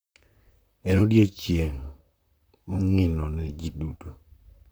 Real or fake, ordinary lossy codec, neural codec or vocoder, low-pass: fake; none; vocoder, 44.1 kHz, 128 mel bands, Pupu-Vocoder; none